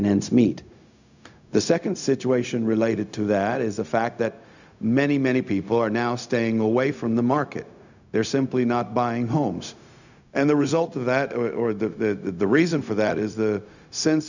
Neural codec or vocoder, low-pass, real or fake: codec, 16 kHz, 0.4 kbps, LongCat-Audio-Codec; 7.2 kHz; fake